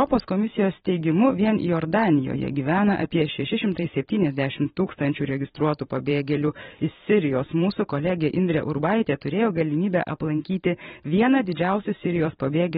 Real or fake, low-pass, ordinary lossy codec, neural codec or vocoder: fake; 19.8 kHz; AAC, 16 kbps; vocoder, 44.1 kHz, 128 mel bands every 512 samples, BigVGAN v2